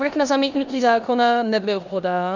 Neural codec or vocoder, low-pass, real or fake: codec, 16 kHz in and 24 kHz out, 0.9 kbps, LongCat-Audio-Codec, four codebook decoder; 7.2 kHz; fake